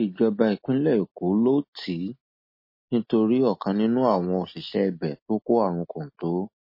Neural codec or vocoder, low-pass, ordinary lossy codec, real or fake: none; 5.4 kHz; MP3, 24 kbps; real